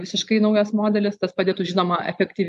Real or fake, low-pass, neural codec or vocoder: real; 10.8 kHz; none